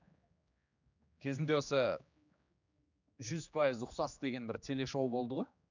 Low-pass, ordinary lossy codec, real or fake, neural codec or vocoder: 7.2 kHz; none; fake; codec, 16 kHz, 2 kbps, X-Codec, HuBERT features, trained on general audio